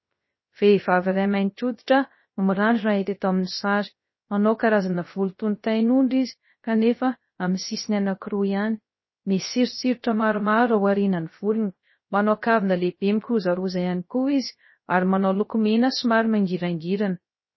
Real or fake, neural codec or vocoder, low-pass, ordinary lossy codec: fake; codec, 16 kHz, 0.3 kbps, FocalCodec; 7.2 kHz; MP3, 24 kbps